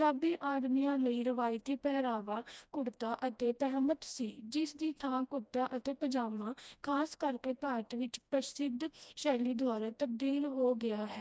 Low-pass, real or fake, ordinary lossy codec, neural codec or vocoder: none; fake; none; codec, 16 kHz, 1 kbps, FreqCodec, smaller model